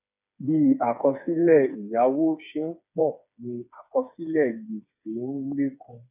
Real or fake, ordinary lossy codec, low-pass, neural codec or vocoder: fake; none; 3.6 kHz; codec, 16 kHz, 4 kbps, FreqCodec, smaller model